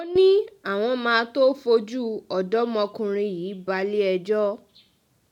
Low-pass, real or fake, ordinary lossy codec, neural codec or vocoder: 19.8 kHz; real; none; none